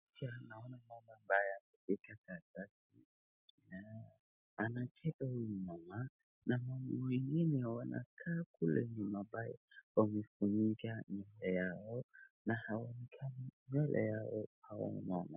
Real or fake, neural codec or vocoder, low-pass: real; none; 3.6 kHz